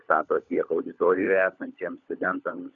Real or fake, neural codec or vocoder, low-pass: fake; codec, 16 kHz, 16 kbps, FunCodec, trained on LibriTTS, 50 frames a second; 7.2 kHz